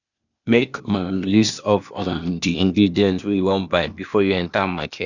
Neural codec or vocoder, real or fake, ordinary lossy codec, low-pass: codec, 16 kHz, 0.8 kbps, ZipCodec; fake; none; 7.2 kHz